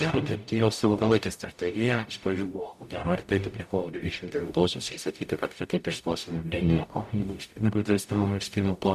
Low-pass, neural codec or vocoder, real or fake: 14.4 kHz; codec, 44.1 kHz, 0.9 kbps, DAC; fake